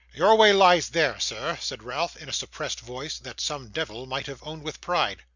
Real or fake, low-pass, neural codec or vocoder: real; 7.2 kHz; none